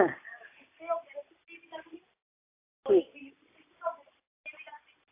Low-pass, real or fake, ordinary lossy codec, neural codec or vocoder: 3.6 kHz; real; AAC, 24 kbps; none